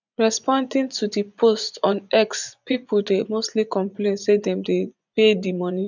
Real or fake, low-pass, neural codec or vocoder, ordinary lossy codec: fake; 7.2 kHz; vocoder, 24 kHz, 100 mel bands, Vocos; none